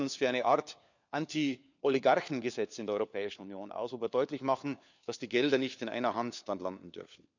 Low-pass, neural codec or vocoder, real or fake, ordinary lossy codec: 7.2 kHz; codec, 16 kHz, 4 kbps, FunCodec, trained on LibriTTS, 50 frames a second; fake; none